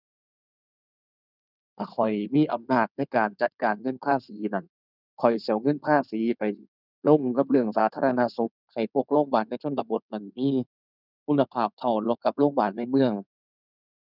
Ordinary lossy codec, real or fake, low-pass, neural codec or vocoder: none; fake; 5.4 kHz; codec, 16 kHz in and 24 kHz out, 2.2 kbps, FireRedTTS-2 codec